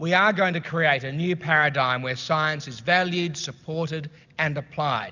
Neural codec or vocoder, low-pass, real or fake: none; 7.2 kHz; real